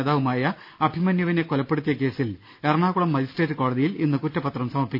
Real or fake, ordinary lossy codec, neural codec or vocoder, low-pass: real; none; none; 5.4 kHz